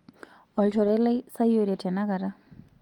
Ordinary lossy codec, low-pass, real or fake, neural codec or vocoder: Opus, 32 kbps; 19.8 kHz; real; none